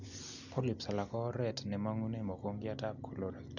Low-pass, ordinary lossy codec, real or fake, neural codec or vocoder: 7.2 kHz; none; real; none